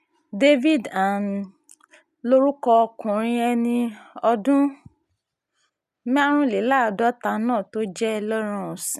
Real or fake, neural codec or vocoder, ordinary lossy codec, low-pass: real; none; none; 14.4 kHz